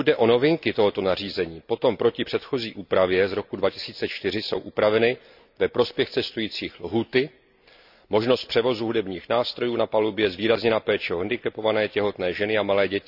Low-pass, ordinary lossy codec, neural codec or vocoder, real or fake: 5.4 kHz; none; none; real